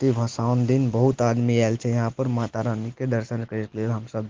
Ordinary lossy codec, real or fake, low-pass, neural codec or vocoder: Opus, 16 kbps; real; 7.2 kHz; none